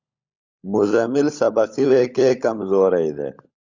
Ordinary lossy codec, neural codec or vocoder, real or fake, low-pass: Opus, 64 kbps; codec, 16 kHz, 16 kbps, FunCodec, trained on LibriTTS, 50 frames a second; fake; 7.2 kHz